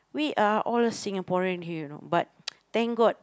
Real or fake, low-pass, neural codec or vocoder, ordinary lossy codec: real; none; none; none